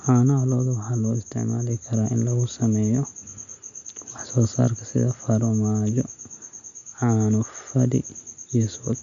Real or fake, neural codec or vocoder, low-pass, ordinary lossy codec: real; none; 7.2 kHz; none